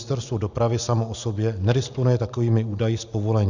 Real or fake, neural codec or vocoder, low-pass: real; none; 7.2 kHz